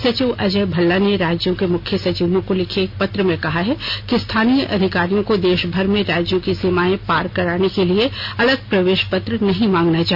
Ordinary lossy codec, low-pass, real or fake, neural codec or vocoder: none; 5.4 kHz; real; none